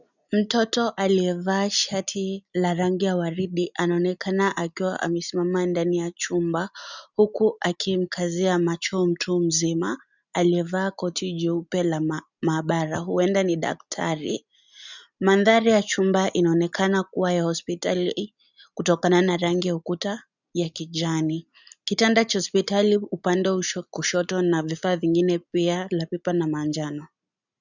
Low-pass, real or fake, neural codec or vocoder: 7.2 kHz; real; none